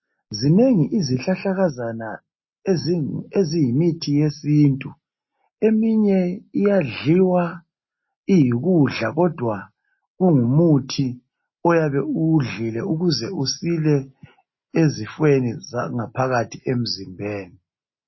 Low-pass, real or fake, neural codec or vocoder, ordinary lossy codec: 7.2 kHz; real; none; MP3, 24 kbps